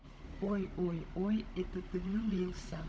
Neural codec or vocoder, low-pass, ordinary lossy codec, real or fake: codec, 16 kHz, 16 kbps, FunCodec, trained on Chinese and English, 50 frames a second; none; none; fake